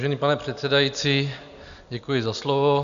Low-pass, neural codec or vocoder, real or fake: 7.2 kHz; none; real